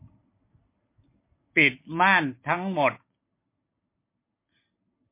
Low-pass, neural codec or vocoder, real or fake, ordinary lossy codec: 3.6 kHz; none; real; MP3, 24 kbps